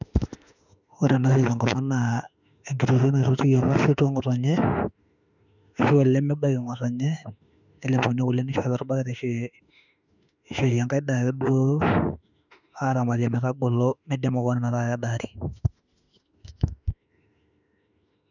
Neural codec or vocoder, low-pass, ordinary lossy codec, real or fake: autoencoder, 48 kHz, 32 numbers a frame, DAC-VAE, trained on Japanese speech; 7.2 kHz; none; fake